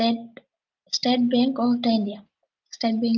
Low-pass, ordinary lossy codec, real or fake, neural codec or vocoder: 7.2 kHz; Opus, 24 kbps; real; none